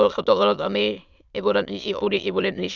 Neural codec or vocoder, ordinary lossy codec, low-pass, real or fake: autoencoder, 22.05 kHz, a latent of 192 numbers a frame, VITS, trained on many speakers; none; 7.2 kHz; fake